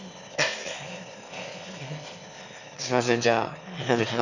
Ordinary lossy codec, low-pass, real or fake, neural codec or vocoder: none; 7.2 kHz; fake; autoencoder, 22.05 kHz, a latent of 192 numbers a frame, VITS, trained on one speaker